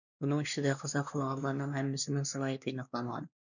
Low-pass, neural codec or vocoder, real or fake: 7.2 kHz; codec, 16 kHz, 2 kbps, X-Codec, WavLM features, trained on Multilingual LibriSpeech; fake